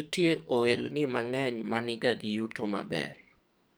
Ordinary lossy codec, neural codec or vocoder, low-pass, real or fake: none; codec, 44.1 kHz, 2.6 kbps, SNAC; none; fake